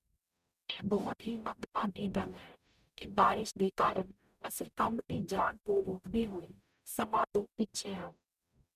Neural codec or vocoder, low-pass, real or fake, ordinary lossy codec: codec, 44.1 kHz, 0.9 kbps, DAC; 14.4 kHz; fake; none